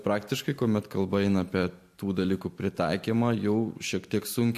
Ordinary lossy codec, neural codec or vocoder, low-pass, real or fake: MP3, 64 kbps; none; 14.4 kHz; real